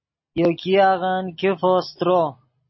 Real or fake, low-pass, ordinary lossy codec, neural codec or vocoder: real; 7.2 kHz; MP3, 24 kbps; none